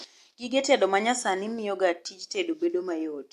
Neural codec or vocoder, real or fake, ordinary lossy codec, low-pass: none; real; AAC, 64 kbps; 14.4 kHz